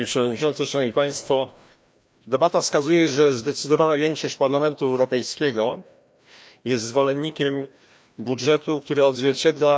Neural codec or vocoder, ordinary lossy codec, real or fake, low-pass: codec, 16 kHz, 1 kbps, FreqCodec, larger model; none; fake; none